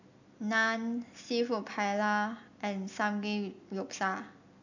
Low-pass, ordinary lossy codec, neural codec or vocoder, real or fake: 7.2 kHz; none; none; real